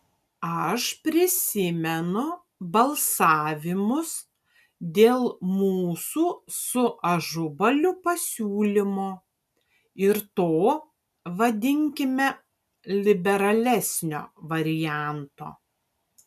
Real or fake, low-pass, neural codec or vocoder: real; 14.4 kHz; none